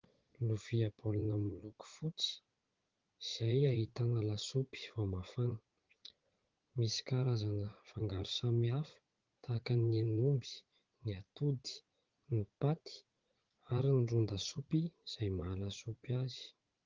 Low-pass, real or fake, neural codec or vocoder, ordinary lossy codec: 7.2 kHz; fake; vocoder, 44.1 kHz, 80 mel bands, Vocos; Opus, 16 kbps